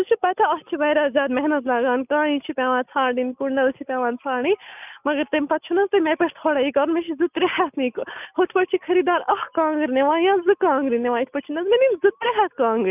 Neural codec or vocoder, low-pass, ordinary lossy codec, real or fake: none; 3.6 kHz; none; real